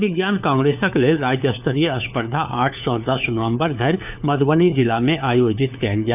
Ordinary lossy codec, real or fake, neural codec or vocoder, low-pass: none; fake; codec, 16 kHz, 4 kbps, FunCodec, trained on LibriTTS, 50 frames a second; 3.6 kHz